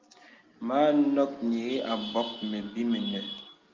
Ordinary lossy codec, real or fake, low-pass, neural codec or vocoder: Opus, 32 kbps; real; 7.2 kHz; none